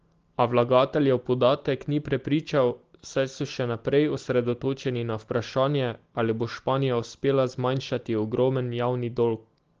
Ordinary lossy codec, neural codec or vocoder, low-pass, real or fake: Opus, 16 kbps; none; 7.2 kHz; real